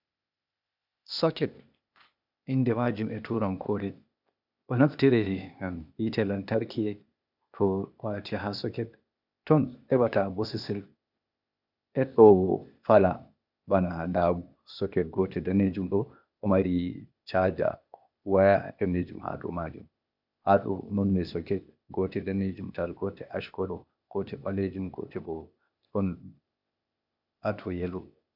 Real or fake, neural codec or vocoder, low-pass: fake; codec, 16 kHz, 0.8 kbps, ZipCodec; 5.4 kHz